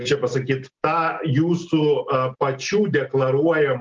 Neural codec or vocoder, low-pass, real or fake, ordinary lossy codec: none; 7.2 kHz; real; Opus, 32 kbps